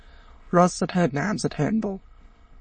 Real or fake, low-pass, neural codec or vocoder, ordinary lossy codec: fake; 9.9 kHz; autoencoder, 22.05 kHz, a latent of 192 numbers a frame, VITS, trained on many speakers; MP3, 32 kbps